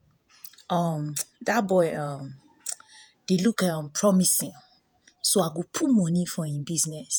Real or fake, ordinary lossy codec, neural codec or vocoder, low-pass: fake; none; vocoder, 48 kHz, 128 mel bands, Vocos; none